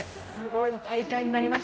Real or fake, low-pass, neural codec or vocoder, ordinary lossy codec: fake; none; codec, 16 kHz, 0.5 kbps, X-Codec, HuBERT features, trained on general audio; none